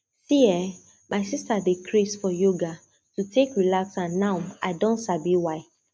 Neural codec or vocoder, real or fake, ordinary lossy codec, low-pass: none; real; none; none